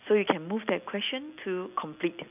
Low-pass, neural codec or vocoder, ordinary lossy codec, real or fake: 3.6 kHz; none; none; real